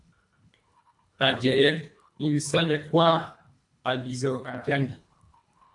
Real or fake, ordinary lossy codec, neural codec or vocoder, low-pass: fake; AAC, 64 kbps; codec, 24 kHz, 1.5 kbps, HILCodec; 10.8 kHz